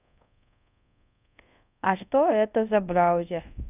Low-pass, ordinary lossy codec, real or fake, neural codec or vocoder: 3.6 kHz; none; fake; codec, 24 kHz, 0.5 kbps, DualCodec